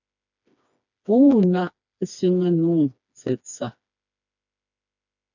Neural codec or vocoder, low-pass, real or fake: codec, 16 kHz, 2 kbps, FreqCodec, smaller model; 7.2 kHz; fake